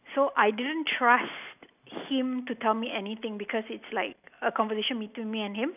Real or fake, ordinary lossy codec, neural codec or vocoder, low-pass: real; none; none; 3.6 kHz